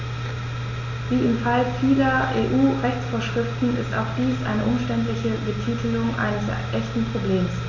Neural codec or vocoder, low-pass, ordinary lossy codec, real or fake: none; 7.2 kHz; none; real